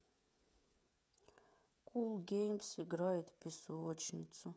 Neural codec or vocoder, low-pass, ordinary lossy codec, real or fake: codec, 16 kHz, 16 kbps, FreqCodec, smaller model; none; none; fake